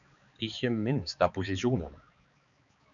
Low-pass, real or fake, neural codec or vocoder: 7.2 kHz; fake; codec, 16 kHz, 4 kbps, X-Codec, HuBERT features, trained on balanced general audio